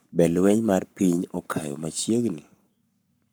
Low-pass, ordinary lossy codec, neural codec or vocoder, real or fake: none; none; codec, 44.1 kHz, 7.8 kbps, Pupu-Codec; fake